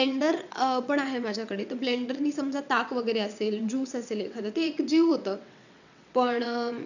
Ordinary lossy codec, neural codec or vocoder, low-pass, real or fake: none; vocoder, 22.05 kHz, 80 mel bands, WaveNeXt; 7.2 kHz; fake